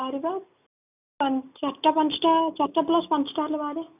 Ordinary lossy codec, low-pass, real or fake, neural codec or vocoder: none; 3.6 kHz; real; none